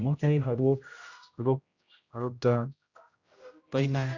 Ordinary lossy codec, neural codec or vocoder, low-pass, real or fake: Opus, 64 kbps; codec, 16 kHz, 0.5 kbps, X-Codec, HuBERT features, trained on general audio; 7.2 kHz; fake